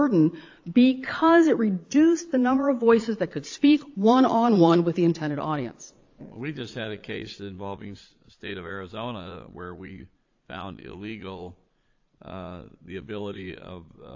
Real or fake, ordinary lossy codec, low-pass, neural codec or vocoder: fake; AAC, 48 kbps; 7.2 kHz; vocoder, 22.05 kHz, 80 mel bands, Vocos